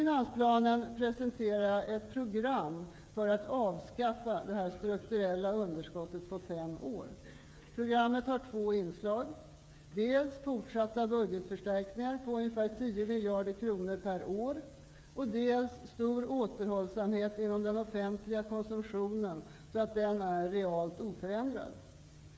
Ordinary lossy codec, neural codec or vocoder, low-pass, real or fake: none; codec, 16 kHz, 8 kbps, FreqCodec, smaller model; none; fake